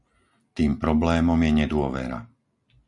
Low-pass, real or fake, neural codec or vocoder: 10.8 kHz; real; none